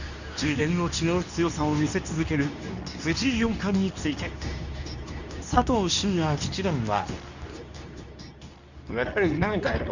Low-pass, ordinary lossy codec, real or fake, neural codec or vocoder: 7.2 kHz; none; fake; codec, 24 kHz, 0.9 kbps, WavTokenizer, medium speech release version 2